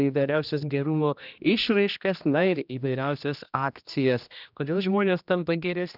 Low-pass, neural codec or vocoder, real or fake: 5.4 kHz; codec, 16 kHz, 1 kbps, X-Codec, HuBERT features, trained on general audio; fake